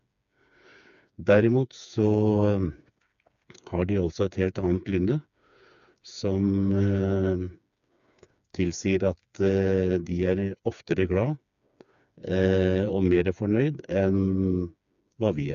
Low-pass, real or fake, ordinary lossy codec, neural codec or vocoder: 7.2 kHz; fake; MP3, 96 kbps; codec, 16 kHz, 4 kbps, FreqCodec, smaller model